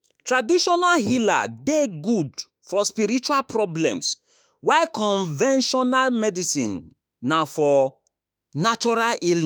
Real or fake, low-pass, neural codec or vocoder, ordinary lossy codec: fake; none; autoencoder, 48 kHz, 32 numbers a frame, DAC-VAE, trained on Japanese speech; none